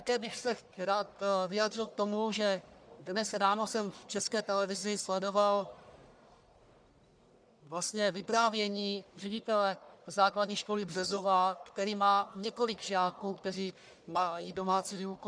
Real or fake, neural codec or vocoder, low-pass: fake; codec, 44.1 kHz, 1.7 kbps, Pupu-Codec; 9.9 kHz